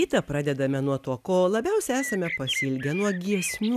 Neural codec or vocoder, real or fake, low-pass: none; real; 14.4 kHz